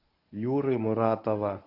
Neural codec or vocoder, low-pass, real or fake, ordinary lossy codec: codec, 24 kHz, 0.9 kbps, WavTokenizer, medium speech release version 1; 5.4 kHz; fake; AAC, 48 kbps